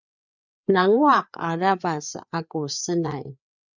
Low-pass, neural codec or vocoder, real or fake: 7.2 kHz; codec, 16 kHz, 4 kbps, FreqCodec, larger model; fake